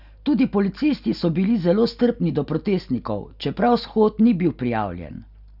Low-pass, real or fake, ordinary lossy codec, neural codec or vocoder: 5.4 kHz; real; none; none